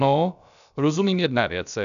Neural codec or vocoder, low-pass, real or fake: codec, 16 kHz, about 1 kbps, DyCAST, with the encoder's durations; 7.2 kHz; fake